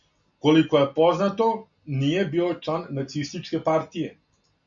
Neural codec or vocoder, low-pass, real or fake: none; 7.2 kHz; real